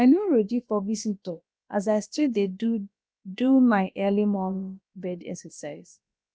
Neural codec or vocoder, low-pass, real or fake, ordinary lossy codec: codec, 16 kHz, about 1 kbps, DyCAST, with the encoder's durations; none; fake; none